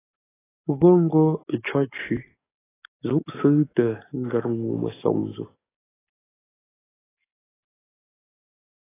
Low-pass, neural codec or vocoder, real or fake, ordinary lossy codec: 3.6 kHz; codec, 44.1 kHz, 7.8 kbps, DAC; fake; AAC, 16 kbps